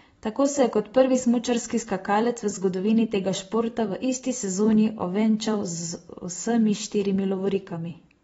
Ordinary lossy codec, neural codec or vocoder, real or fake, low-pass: AAC, 24 kbps; vocoder, 44.1 kHz, 128 mel bands every 256 samples, BigVGAN v2; fake; 19.8 kHz